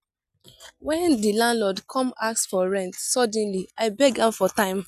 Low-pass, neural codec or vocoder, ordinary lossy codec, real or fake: 14.4 kHz; none; none; real